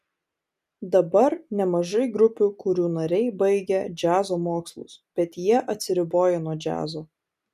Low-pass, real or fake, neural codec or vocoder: 14.4 kHz; real; none